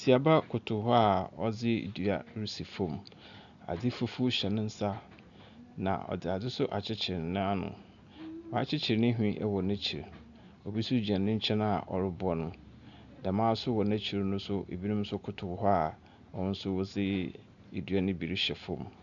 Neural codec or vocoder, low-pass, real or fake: none; 7.2 kHz; real